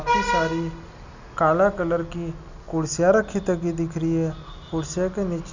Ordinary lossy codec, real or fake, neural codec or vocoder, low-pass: none; real; none; 7.2 kHz